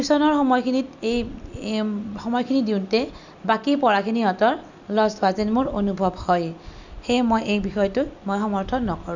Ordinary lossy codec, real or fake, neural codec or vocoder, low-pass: none; real; none; 7.2 kHz